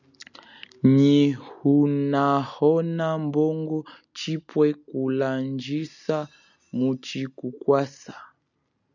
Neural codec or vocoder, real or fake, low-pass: none; real; 7.2 kHz